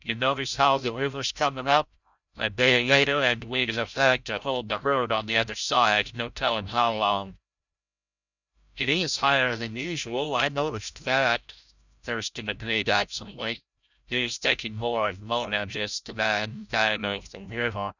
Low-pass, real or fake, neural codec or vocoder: 7.2 kHz; fake; codec, 16 kHz, 0.5 kbps, FreqCodec, larger model